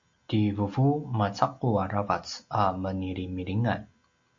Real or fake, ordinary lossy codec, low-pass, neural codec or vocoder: real; AAC, 48 kbps; 7.2 kHz; none